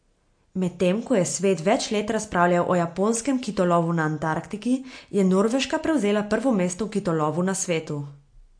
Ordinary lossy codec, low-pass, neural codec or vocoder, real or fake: MP3, 48 kbps; 9.9 kHz; none; real